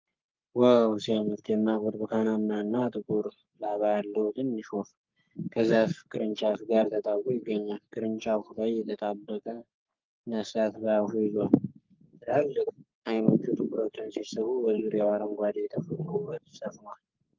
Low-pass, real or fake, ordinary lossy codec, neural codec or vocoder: 7.2 kHz; fake; Opus, 24 kbps; codec, 44.1 kHz, 3.4 kbps, Pupu-Codec